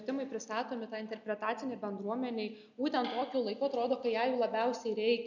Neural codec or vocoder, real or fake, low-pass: none; real; 7.2 kHz